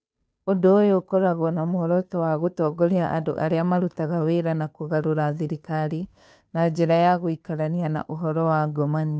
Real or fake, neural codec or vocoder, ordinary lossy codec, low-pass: fake; codec, 16 kHz, 2 kbps, FunCodec, trained on Chinese and English, 25 frames a second; none; none